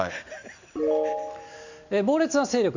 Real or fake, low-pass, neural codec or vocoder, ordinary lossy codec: real; 7.2 kHz; none; Opus, 64 kbps